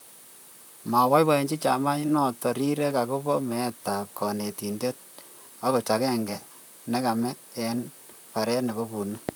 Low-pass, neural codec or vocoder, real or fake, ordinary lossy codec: none; vocoder, 44.1 kHz, 128 mel bands, Pupu-Vocoder; fake; none